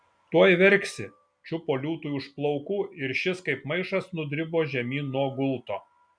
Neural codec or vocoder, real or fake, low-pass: none; real; 9.9 kHz